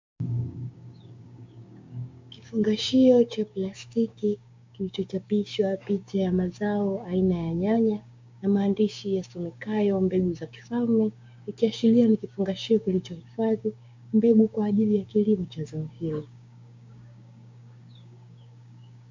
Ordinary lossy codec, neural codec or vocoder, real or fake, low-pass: MP3, 48 kbps; codec, 16 kHz, 6 kbps, DAC; fake; 7.2 kHz